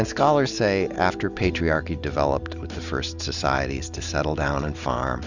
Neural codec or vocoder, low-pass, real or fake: none; 7.2 kHz; real